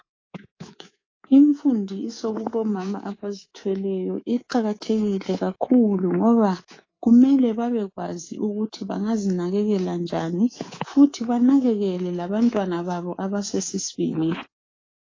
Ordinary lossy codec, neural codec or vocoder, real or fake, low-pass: AAC, 32 kbps; codec, 24 kHz, 3.1 kbps, DualCodec; fake; 7.2 kHz